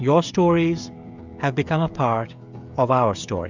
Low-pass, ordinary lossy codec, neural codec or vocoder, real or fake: 7.2 kHz; Opus, 64 kbps; codec, 16 kHz, 16 kbps, FreqCodec, smaller model; fake